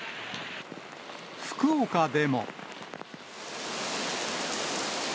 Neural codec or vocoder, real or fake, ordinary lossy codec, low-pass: none; real; none; none